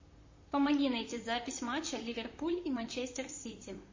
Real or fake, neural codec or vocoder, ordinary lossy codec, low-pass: fake; vocoder, 44.1 kHz, 128 mel bands, Pupu-Vocoder; MP3, 32 kbps; 7.2 kHz